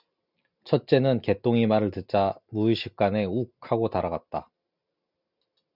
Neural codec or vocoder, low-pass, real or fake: none; 5.4 kHz; real